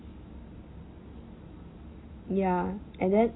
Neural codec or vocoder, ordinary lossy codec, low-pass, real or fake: none; AAC, 16 kbps; 7.2 kHz; real